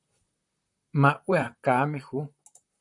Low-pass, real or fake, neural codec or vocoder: 10.8 kHz; fake; vocoder, 44.1 kHz, 128 mel bands, Pupu-Vocoder